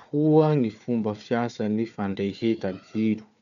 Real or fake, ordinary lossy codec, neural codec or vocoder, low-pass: fake; none; codec, 16 kHz, 4 kbps, FunCodec, trained on Chinese and English, 50 frames a second; 7.2 kHz